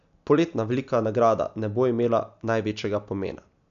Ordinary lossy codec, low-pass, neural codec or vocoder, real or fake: none; 7.2 kHz; none; real